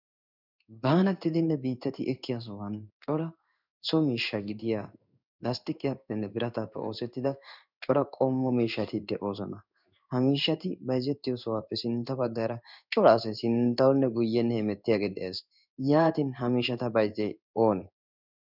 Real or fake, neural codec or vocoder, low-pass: fake; codec, 16 kHz in and 24 kHz out, 1 kbps, XY-Tokenizer; 5.4 kHz